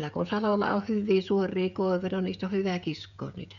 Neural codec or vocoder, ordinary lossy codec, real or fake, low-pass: codec, 16 kHz, 16 kbps, FreqCodec, smaller model; none; fake; 7.2 kHz